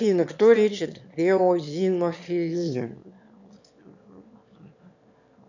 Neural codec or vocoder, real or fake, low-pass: autoencoder, 22.05 kHz, a latent of 192 numbers a frame, VITS, trained on one speaker; fake; 7.2 kHz